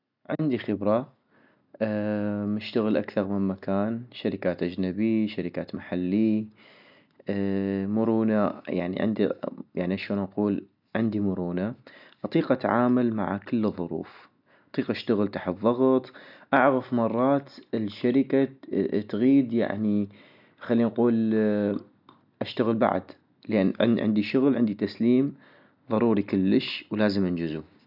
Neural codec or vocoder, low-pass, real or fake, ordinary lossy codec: none; 5.4 kHz; real; none